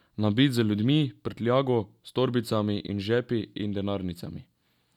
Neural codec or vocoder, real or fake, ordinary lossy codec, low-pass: none; real; none; 19.8 kHz